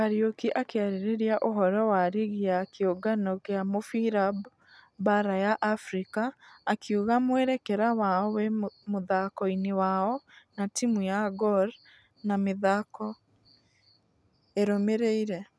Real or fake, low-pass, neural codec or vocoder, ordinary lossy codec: real; none; none; none